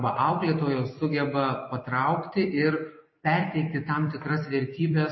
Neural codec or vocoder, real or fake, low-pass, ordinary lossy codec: vocoder, 44.1 kHz, 128 mel bands every 256 samples, BigVGAN v2; fake; 7.2 kHz; MP3, 24 kbps